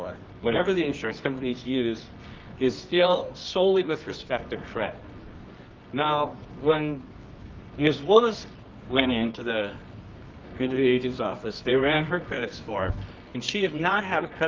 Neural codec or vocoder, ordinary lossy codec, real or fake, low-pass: codec, 24 kHz, 0.9 kbps, WavTokenizer, medium music audio release; Opus, 24 kbps; fake; 7.2 kHz